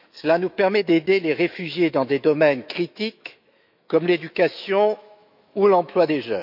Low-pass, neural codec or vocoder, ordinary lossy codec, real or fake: 5.4 kHz; autoencoder, 48 kHz, 128 numbers a frame, DAC-VAE, trained on Japanese speech; none; fake